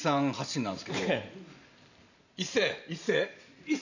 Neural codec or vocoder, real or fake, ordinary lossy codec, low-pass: vocoder, 44.1 kHz, 128 mel bands every 512 samples, BigVGAN v2; fake; none; 7.2 kHz